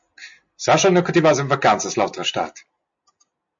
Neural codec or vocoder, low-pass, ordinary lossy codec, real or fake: none; 7.2 kHz; MP3, 48 kbps; real